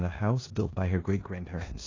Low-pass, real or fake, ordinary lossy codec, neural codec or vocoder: 7.2 kHz; fake; AAC, 32 kbps; codec, 16 kHz in and 24 kHz out, 0.9 kbps, LongCat-Audio-Codec, four codebook decoder